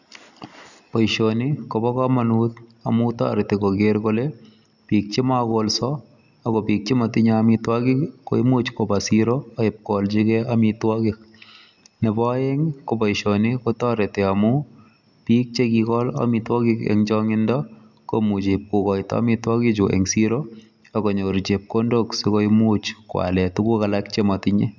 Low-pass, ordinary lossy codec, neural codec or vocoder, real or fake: 7.2 kHz; none; none; real